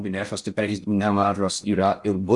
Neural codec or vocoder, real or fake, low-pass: codec, 16 kHz in and 24 kHz out, 0.6 kbps, FocalCodec, streaming, 4096 codes; fake; 10.8 kHz